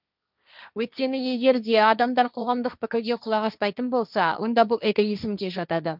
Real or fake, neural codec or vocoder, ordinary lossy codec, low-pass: fake; codec, 16 kHz, 1.1 kbps, Voila-Tokenizer; none; 5.4 kHz